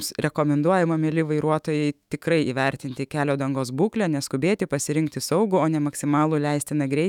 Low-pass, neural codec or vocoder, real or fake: 19.8 kHz; autoencoder, 48 kHz, 128 numbers a frame, DAC-VAE, trained on Japanese speech; fake